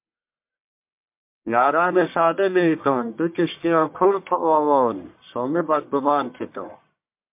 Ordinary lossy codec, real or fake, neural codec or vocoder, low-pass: MP3, 32 kbps; fake; codec, 44.1 kHz, 1.7 kbps, Pupu-Codec; 3.6 kHz